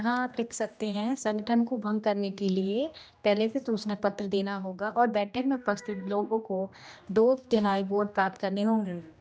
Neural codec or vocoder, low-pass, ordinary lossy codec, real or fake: codec, 16 kHz, 1 kbps, X-Codec, HuBERT features, trained on general audio; none; none; fake